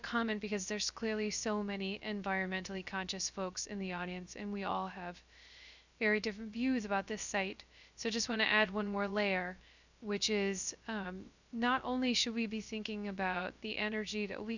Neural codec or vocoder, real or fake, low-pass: codec, 16 kHz, 0.3 kbps, FocalCodec; fake; 7.2 kHz